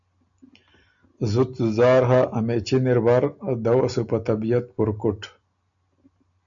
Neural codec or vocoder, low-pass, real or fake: none; 7.2 kHz; real